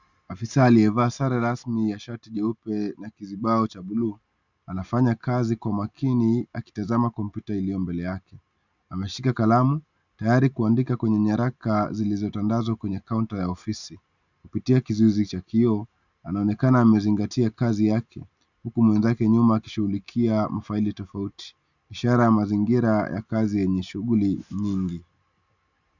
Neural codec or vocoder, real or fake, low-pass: none; real; 7.2 kHz